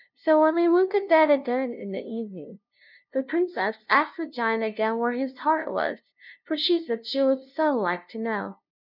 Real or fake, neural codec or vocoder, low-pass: fake; codec, 16 kHz, 0.5 kbps, FunCodec, trained on LibriTTS, 25 frames a second; 5.4 kHz